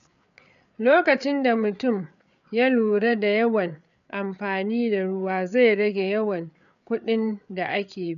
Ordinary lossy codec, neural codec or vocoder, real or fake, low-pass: none; codec, 16 kHz, 8 kbps, FreqCodec, larger model; fake; 7.2 kHz